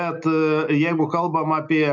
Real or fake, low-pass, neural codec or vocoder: real; 7.2 kHz; none